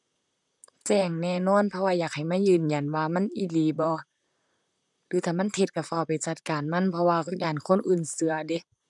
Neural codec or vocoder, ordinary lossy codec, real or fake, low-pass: vocoder, 44.1 kHz, 128 mel bands, Pupu-Vocoder; none; fake; 10.8 kHz